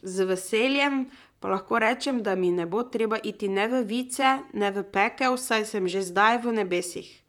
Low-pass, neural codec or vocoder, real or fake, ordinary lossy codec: 19.8 kHz; vocoder, 44.1 kHz, 128 mel bands, Pupu-Vocoder; fake; none